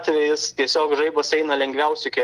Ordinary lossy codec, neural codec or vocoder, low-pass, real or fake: Opus, 24 kbps; none; 14.4 kHz; real